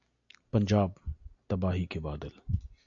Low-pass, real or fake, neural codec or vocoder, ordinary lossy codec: 7.2 kHz; real; none; AAC, 64 kbps